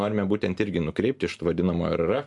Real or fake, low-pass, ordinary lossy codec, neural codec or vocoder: real; 10.8 kHz; MP3, 64 kbps; none